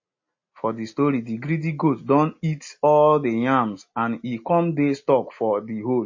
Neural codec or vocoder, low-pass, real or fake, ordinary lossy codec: none; 7.2 kHz; real; MP3, 32 kbps